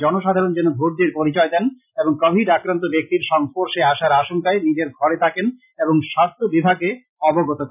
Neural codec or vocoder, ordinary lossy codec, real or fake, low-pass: none; none; real; 3.6 kHz